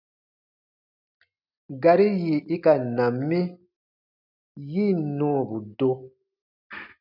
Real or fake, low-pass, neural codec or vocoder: real; 5.4 kHz; none